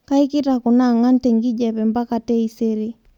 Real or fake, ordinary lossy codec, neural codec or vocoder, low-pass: real; none; none; 19.8 kHz